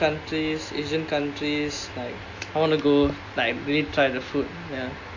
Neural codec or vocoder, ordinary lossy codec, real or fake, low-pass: none; AAC, 48 kbps; real; 7.2 kHz